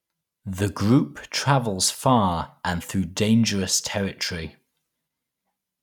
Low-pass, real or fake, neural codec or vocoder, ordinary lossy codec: 19.8 kHz; real; none; none